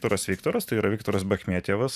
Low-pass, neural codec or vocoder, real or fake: 14.4 kHz; vocoder, 44.1 kHz, 128 mel bands every 512 samples, BigVGAN v2; fake